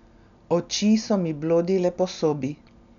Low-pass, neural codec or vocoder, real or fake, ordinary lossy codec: 7.2 kHz; none; real; none